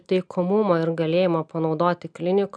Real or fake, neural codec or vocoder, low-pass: real; none; 9.9 kHz